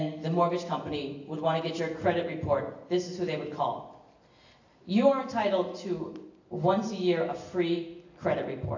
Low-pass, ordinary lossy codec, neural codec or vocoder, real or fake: 7.2 kHz; AAC, 48 kbps; none; real